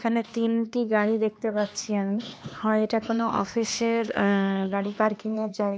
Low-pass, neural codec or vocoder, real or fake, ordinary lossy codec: none; codec, 16 kHz, 2 kbps, X-Codec, HuBERT features, trained on LibriSpeech; fake; none